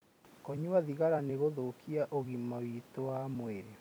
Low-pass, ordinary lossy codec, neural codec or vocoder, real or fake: none; none; vocoder, 44.1 kHz, 128 mel bands every 256 samples, BigVGAN v2; fake